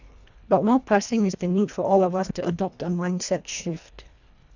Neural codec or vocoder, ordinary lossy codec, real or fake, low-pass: codec, 24 kHz, 1.5 kbps, HILCodec; none; fake; 7.2 kHz